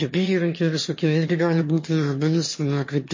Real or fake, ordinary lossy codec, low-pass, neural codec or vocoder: fake; MP3, 32 kbps; 7.2 kHz; autoencoder, 22.05 kHz, a latent of 192 numbers a frame, VITS, trained on one speaker